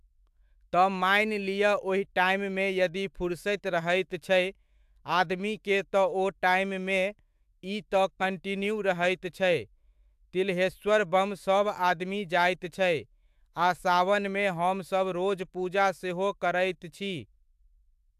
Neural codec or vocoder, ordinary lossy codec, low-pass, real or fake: autoencoder, 48 kHz, 128 numbers a frame, DAC-VAE, trained on Japanese speech; AAC, 96 kbps; 14.4 kHz; fake